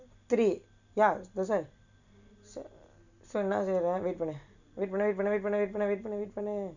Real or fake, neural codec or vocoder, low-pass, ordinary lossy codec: real; none; 7.2 kHz; none